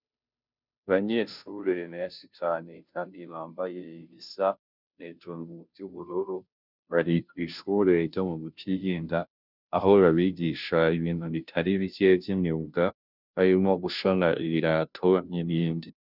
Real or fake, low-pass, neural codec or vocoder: fake; 5.4 kHz; codec, 16 kHz, 0.5 kbps, FunCodec, trained on Chinese and English, 25 frames a second